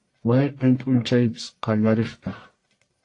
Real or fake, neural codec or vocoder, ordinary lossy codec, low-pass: fake; codec, 44.1 kHz, 1.7 kbps, Pupu-Codec; AAC, 48 kbps; 10.8 kHz